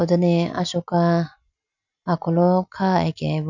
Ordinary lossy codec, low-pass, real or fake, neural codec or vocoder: none; 7.2 kHz; real; none